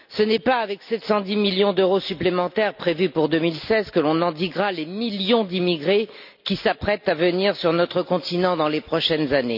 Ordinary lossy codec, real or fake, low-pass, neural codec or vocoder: none; real; 5.4 kHz; none